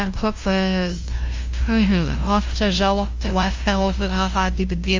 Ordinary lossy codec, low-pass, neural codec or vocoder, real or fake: Opus, 32 kbps; 7.2 kHz; codec, 16 kHz, 0.5 kbps, FunCodec, trained on LibriTTS, 25 frames a second; fake